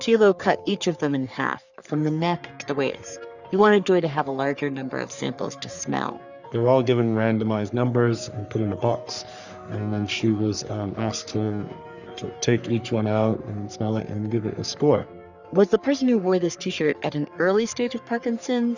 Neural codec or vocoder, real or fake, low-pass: codec, 44.1 kHz, 3.4 kbps, Pupu-Codec; fake; 7.2 kHz